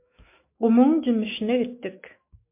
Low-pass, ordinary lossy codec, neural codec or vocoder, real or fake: 3.6 kHz; MP3, 24 kbps; autoencoder, 48 kHz, 128 numbers a frame, DAC-VAE, trained on Japanese speech; fake